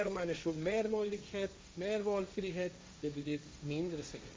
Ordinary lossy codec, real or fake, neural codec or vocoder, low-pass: none; fake; codec, 16 kHz, 1.1 kbps, Voila-Tokenizer; none